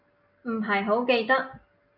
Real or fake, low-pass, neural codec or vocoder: real; 5.4 kHz; none